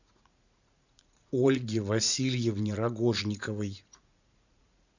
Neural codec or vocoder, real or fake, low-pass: vocoder, 44.1 kHz, 80 mel bands, Vocos; fake; 7.2 kHz